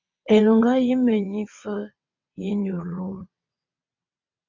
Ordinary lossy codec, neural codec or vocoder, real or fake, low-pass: MP3, 64 kbps; vocoder, 22.05 kHz, 80 mel bands, WaveNeXt; fake; 7.2 kHz